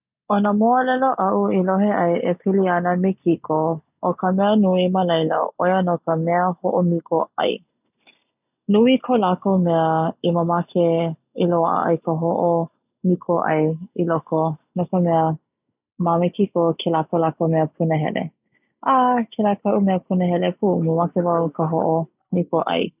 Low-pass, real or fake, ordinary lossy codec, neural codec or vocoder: 3.6 kHz; real; none; none